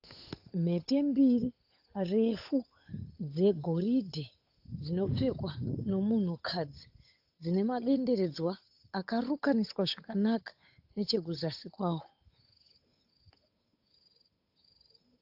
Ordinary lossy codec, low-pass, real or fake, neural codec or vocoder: AAC, 48 kbps; 5.4 kHz; fake; codec, 16 kHz, 8 kbps, FunCodec, trained on Chinese and English, 25 frames a second